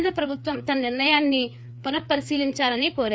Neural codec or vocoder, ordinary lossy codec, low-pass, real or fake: codec, 16 kHz, 4 kbps, FreqCodec, larger model; none; none; fake